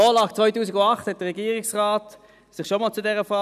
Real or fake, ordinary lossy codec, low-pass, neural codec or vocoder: real; none; 14.4 kHz; none